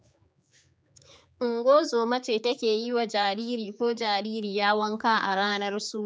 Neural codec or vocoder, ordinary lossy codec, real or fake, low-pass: codec, 16 kHz, 4 kbps, X-Codec, HuBERT features, trained on general audio; none; fake; none